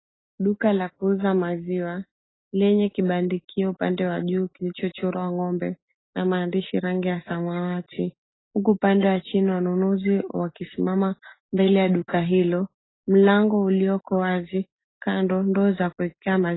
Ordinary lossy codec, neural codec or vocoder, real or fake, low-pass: AAC, 16 kbps; none; real; 7.2 kHz